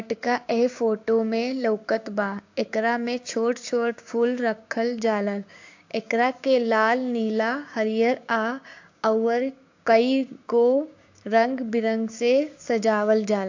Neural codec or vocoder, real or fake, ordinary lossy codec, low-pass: codec, 16 kHz, 6 kbps, DAC; fake; AAC, 48 kbps; 7.2 kHz